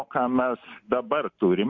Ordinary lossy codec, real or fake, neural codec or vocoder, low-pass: MP3, 64 kbps; real; none; 7.2 kHz